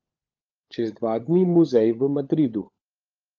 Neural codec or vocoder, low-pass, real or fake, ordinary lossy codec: codec, 16 kHz, 16 kbps, FunCodec, trained on LibriTTS, 50 frames a second; 7.2 kHz; fake; Opus, 24 kbps